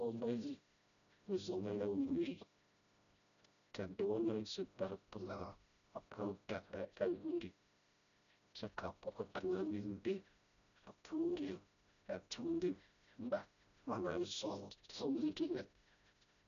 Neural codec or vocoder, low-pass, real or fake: codec, 16 kHz, 0.5 kbps, FreqCodec, smaller model; 7.2 kHz; fake